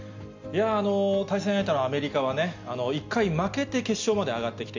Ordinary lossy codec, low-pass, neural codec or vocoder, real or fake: MP3, 64 kbps; 7.2 kHz; none; real